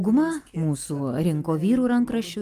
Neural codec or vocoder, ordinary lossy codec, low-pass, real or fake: none; Opus, 32 kbps; 14.4 kHz; real